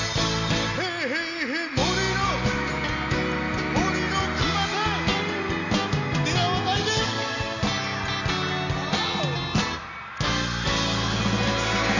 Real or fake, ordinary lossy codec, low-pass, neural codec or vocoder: real; none; 7.2 kHz; none